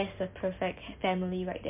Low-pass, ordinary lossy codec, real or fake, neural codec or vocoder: 3.6 kHz; MP3, 32 kbps; real; none